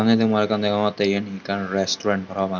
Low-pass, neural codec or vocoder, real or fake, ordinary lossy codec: 7.2 kHz; none; real; none